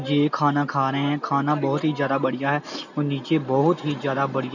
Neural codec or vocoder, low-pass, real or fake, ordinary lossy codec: none; 7.2 kHz; real; none